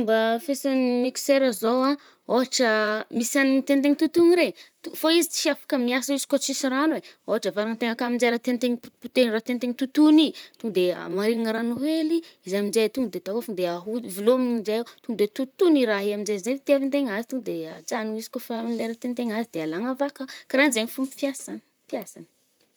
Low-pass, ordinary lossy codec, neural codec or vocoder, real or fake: none; none; vocoder, 44.1 kHz, 128 mel bands, Pupu-Vocoder; fake